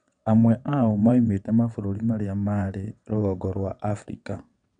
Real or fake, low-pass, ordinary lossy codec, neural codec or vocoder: fake; 9.9 kHz; none; vocoder, 22.05 kHz, 80 mel bands, WaveNeXt